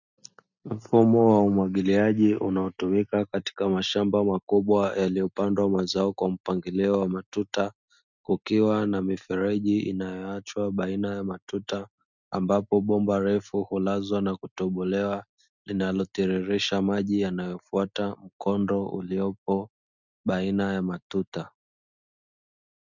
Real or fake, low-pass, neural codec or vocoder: real; 7.2 kHz; none